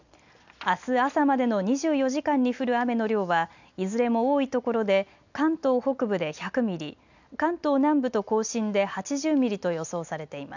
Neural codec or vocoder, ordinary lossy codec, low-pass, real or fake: none; none; 7.2 kHz; real